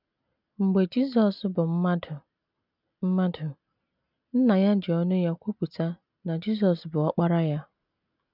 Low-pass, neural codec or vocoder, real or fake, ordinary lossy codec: 5.4 kHz; none; real; none